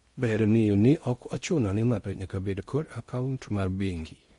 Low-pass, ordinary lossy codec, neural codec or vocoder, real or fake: 10.8 kHz; MP3, 48 kbps; codec, 16 kHz in and 24 kHz out, 0.6 kbps, FocalCodec, streaming, 4096 codes; fake